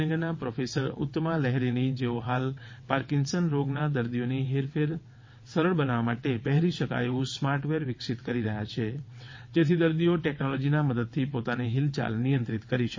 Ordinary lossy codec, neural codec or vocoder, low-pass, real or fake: MP3, 32 kbps; vocoder, 22.05 kHz, 80 mel bands, WaveNeXt; 7.2 kHz; fake